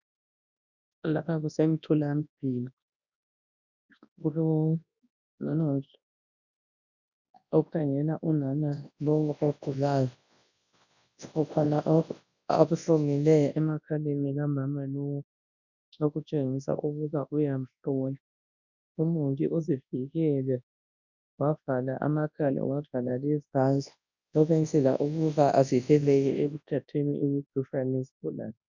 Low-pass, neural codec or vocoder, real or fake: 7.2 kHz; codec, 24 kHz, 0.9 kbps, WavTokenizer, large speech release; fake